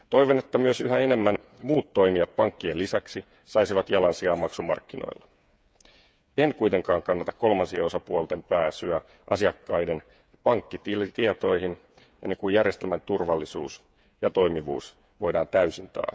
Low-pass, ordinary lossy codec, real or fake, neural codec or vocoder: none; none; fake; codec, 16 kHz, 8 kbps, FreqCodec, smaller model